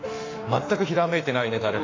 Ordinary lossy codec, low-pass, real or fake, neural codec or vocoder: AAC, 32 kbps; 7.2 kHz; fake; autoencoder, 48 kHz, 32 numbers a frame, DAC-VAE, trained on Japanese speech